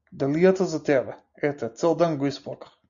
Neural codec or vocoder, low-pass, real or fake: none; 7.2 kHz; real